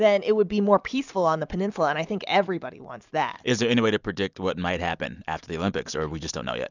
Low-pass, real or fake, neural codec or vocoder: 7.2 kHz; real; none